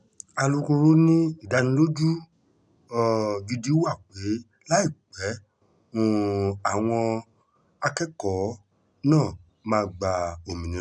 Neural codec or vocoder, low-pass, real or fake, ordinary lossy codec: none; 9.9 kHz; real; none